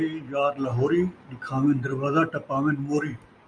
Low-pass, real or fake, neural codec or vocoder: 9.9 kHz; real; none